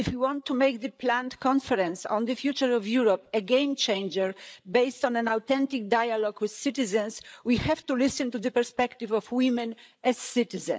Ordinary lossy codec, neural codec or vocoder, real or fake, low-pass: none; codec, 16 kHz, 8 kbps, FreqCodec, larger model; fake; none